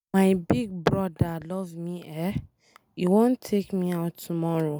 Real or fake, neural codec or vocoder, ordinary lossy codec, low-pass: real; none; none; none